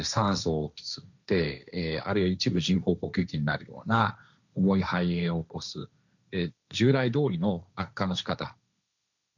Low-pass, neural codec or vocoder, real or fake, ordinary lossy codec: 7.2 kHz; codec, 16 kHz, 1.1 kbps, Voila-Tokenizer; fake; none